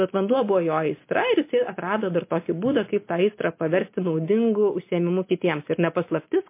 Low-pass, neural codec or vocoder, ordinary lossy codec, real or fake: 3.6 kHz; none; MP3, 24 kbps; real